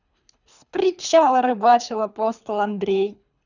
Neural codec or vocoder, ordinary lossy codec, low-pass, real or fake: codec, 24 kHz, 3 kbps, HILCodec; none; 7.2 kHz; fake